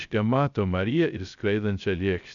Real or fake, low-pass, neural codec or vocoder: fake; 7.2 kHz; codec, 16 kHz, 0.3 kbps, FocalCodec